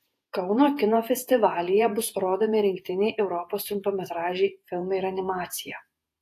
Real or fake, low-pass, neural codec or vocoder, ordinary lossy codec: fake; 14.4 kHz; vocoder, 48 kHz, 128 mel bands, Vocos; AAC, 64 kbps